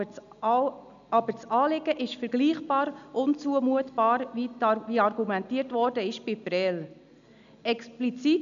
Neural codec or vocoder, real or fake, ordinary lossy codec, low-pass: none; real; none; 7.2 kHz